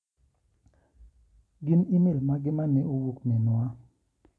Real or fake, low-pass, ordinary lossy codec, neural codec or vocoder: real; none; none; none